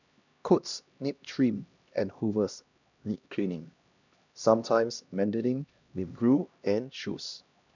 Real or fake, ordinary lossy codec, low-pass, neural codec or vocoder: fake; none; 7.2 kHz; codec, 16 kHz, 1 kbps, X-Codec, HuBERT features, trained on LibriSpeech